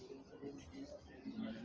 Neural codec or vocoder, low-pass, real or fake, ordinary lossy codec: none; 7.2 kHz; real; Opus, 24 kbps